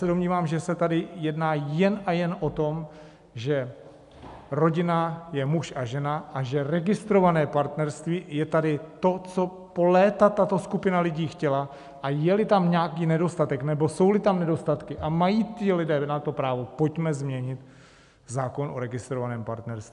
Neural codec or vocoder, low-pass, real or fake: none; 10.8 kHz; real